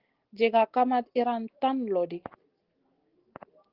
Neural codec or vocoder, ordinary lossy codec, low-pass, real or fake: none; Opus, 16 kbps; 5.4 kHz; real